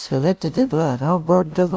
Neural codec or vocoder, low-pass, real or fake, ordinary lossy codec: codec, 16 kHz, 0.5 kbps, FunCodec, trained on LibriTTS, 25 frames a second; none; fake; none